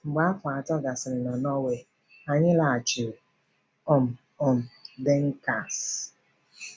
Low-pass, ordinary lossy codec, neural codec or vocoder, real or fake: none; none; none; real